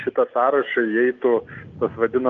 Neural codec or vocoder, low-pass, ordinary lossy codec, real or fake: none; 7.2 kHz; Opus, 24 kbps; real